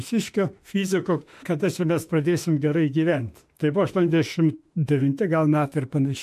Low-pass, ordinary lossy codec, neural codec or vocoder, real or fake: 14.4 kHz; MP3, 64 kbps; autoencoder, 48 kHz, 32 numbers a frame, DAC-VAE, trained on Japanese speech; fake